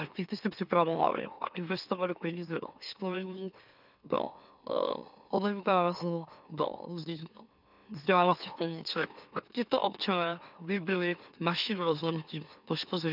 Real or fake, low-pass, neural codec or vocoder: fake; 5.4 kHz; autoencoder, 44.1 kHz, a latent of 192 numbers a frame, MeloTTS